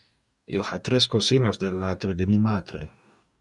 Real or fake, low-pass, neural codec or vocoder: fake; 10.8 kHz; codec, 44.1 kHz, 2.6 kbps, DAC